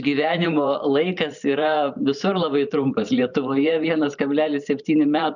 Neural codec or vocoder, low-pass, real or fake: vocoder, 44.1 kHz, 128 mel bands every 512 samples, BigVGAN v2; 7.2 kHz; fake